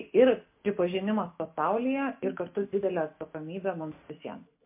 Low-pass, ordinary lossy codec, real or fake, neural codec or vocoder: 3.6 kHz; MP3, 24 kbps; real; none